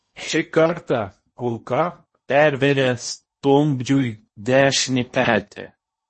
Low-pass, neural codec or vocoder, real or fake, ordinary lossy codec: 10.8 kHz; codec, 16 kHz in and 24 kHz out, 0.8 kbps, FocalCodec, streaming, 65536 codes; fake; MP3, 32 kbps